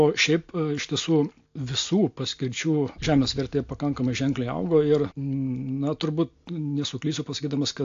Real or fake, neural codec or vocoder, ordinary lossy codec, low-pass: real; none; AAC, 48 kbps; 7.2 kHz